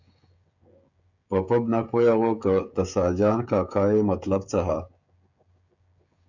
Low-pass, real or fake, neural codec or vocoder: 7.2 kHz; fake; codec, 16 kHz, 16 kbps, FreqCodec, smaller model